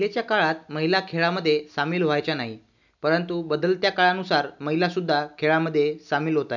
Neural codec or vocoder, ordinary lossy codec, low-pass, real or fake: none; none; 7.2 kHz; real